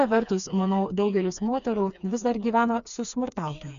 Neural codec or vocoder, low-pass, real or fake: codec, 16 kHz, 4 kbps, FreqCodec, smaller model; 7.2 kHz; fake